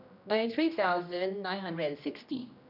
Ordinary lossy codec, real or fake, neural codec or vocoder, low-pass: none; fake; codec, 16 kHz, 1 kbps, X-Codec, HuBERT features, trained on general audio; 5.4 kHz